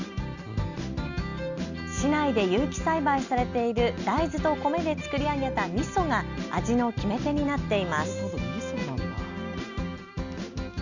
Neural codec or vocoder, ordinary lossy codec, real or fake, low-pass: none; Opus, 64 kbps; real; 7.2 kHz